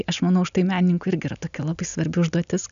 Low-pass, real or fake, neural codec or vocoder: 7.2 kHz; real; none